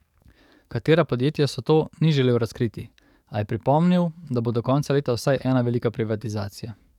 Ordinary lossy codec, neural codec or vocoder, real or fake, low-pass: none; codec, 44.1 kHz, 7.8 kbps, DAC; fake; 19.8 kHz